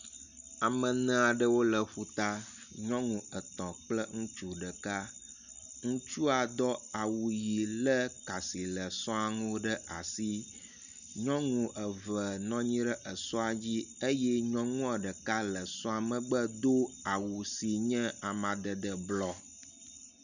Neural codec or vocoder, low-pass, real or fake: none; 7.2 kHz; real